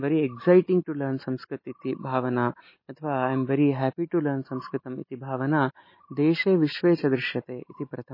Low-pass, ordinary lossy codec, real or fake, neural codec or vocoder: 5.4 kHz; MP3, 24 kbps; real; none